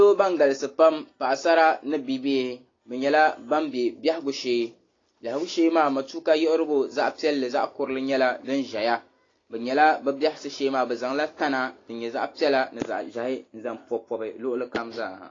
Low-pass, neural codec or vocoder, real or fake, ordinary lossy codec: 7.2 kHz; none; real; AAC, 32 kbps